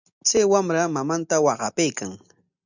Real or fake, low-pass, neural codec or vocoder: real; 7.2 kHz; none